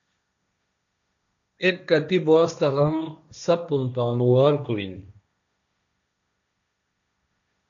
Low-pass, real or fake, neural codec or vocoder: 7.2 kHz; fake; codec, 16 kHz, 1.1 kbps, Voila-Tokenizer